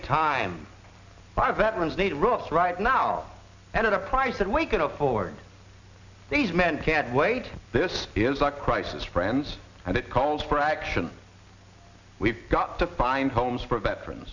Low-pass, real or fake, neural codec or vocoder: 7.2 kHz; real; none